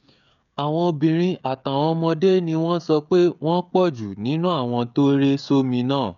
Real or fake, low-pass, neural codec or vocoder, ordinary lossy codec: fake; 7.2 kHz; codec, 16 kHz, 16 kbps, FreqCodec, smaller model; none